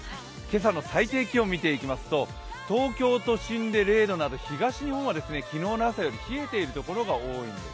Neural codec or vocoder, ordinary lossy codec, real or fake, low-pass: none; none; real; none